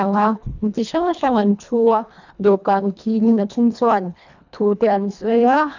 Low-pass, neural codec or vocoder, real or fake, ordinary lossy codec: 7.2 kHz; codec, 24 kHz, 1.5 kbps, HILCodec; fake; none